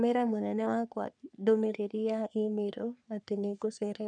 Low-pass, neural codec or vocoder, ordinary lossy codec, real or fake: 9.9 kHz; codec, 44.1 kHz, 3.4 kbps, Pupu-Codec; none; fake